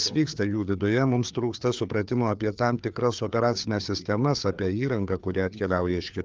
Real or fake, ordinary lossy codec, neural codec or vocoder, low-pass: fake; Opus, 24 kbps; codec, 16 kHz, 4 kbps, FreqCodec, larger model; 7.2 kHz